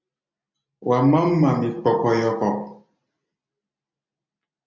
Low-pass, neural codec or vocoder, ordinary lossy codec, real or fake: 7.2 kHz; none; MP3, 64 kbps; real